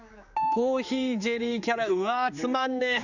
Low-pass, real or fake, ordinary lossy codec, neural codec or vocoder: 7.2 kHz; fake; none; codec, 16 kHz, 4 kbps, X-Codec, HuBERT features, trained on general audio